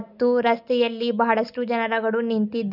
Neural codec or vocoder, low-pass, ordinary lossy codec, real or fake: none; 5.4 kHz; none; real